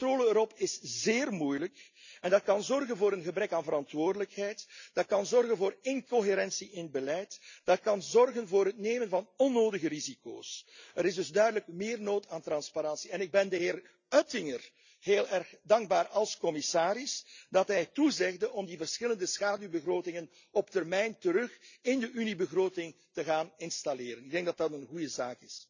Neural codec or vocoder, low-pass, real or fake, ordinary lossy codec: none; 7.2 kHz; real; none